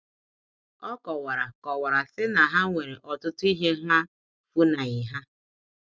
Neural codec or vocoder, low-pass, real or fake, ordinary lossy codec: none; none; real; none